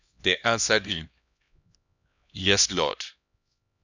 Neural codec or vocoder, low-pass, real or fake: codec, 16 kHz, 1 kbps, X-Codec, HuBERT features, trained on LibriSpeech; 7.2 kHz; fake